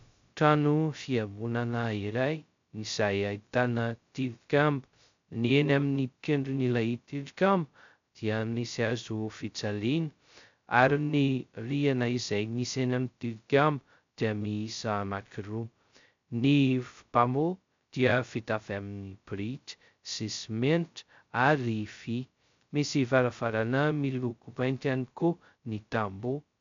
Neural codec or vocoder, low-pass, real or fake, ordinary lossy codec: codec, 16 kHz, 0.2 kbps, FocalCodec; 7.2 kHz; fake; MP3, 64 kbps